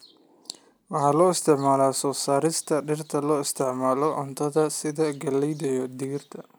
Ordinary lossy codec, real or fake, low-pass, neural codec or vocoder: none; real; none; none